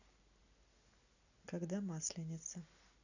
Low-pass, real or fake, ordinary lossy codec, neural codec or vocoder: 7.2 kHz; real; none; none